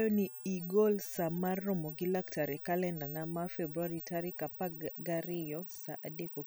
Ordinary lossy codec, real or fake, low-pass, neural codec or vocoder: none; real; none; none